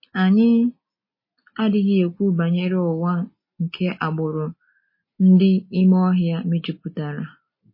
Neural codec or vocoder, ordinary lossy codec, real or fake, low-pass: none; MP3, 24 kbps; real; 5.4 kHz